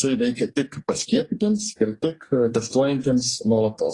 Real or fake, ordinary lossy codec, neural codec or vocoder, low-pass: fake; AAC, 32 kbps; codec, 44.1 kHz, 2.6 kbps, DAC; 10.8 kHz